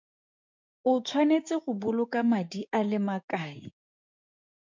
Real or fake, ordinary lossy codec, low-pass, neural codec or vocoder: fake; MP3, 64 kbps; 7.2 kHz; codec, 16 kHz, 6 kbps, DAC